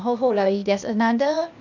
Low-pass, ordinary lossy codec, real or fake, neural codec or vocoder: 7.2 kHz; none; fake; codec, 16 kHz, 0.8 kbps, ZipCodec